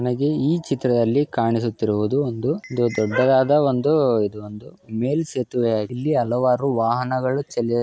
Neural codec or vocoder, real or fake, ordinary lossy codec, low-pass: none; real; none; none